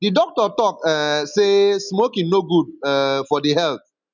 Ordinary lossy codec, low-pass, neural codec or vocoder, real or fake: none; 7.2 kHz; none; real